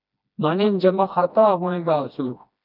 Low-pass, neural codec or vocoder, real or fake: 5.4 kHz; codec, 16 kHz, 1 kbps, FreqCodec, smaller model; fake